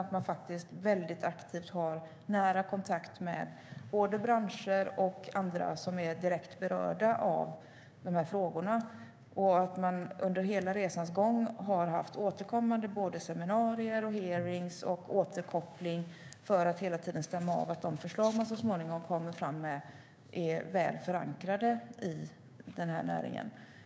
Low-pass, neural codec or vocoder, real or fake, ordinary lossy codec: none; codec, 16 kHz, 6 kbps, DAC; fake; none